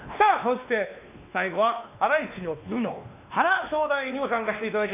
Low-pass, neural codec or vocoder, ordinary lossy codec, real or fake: 3.6 kHz; codec, 16 kHz, 2 kbps, X-Codec, WavLM features, trained on Multilingual LibriSpeech; none; fake